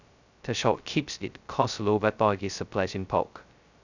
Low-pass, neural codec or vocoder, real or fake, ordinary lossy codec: 7.2 kHz; codec, 16 kHz, 0.2 kbps, FocalCodec; fake; none